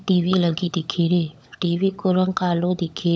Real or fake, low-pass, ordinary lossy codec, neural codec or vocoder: fake; none; none; codec, 16 kHz, 16 kbps, FunCodec, trained on LibriTTS, 50 frames a second